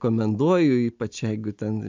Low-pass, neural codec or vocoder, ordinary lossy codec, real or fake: 7.2 kHz; none; MP3, 64 kbps; real